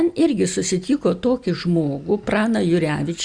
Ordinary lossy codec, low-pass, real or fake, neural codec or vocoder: Opus, 64 kbps; 9.9 kHz; real; none